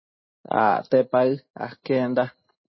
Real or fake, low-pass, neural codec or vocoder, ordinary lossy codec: real; 7.2 kHz; none; MP3, 24 kbps